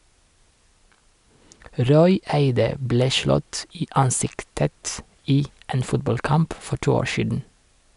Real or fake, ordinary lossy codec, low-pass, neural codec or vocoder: real; none; 10.8 kHz; none